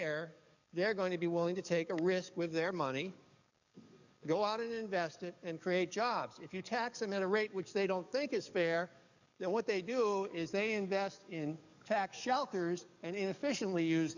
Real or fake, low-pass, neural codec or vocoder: fake; 7.2 kHz; codec, 44.1 kHz, 7.8 kbps, DAC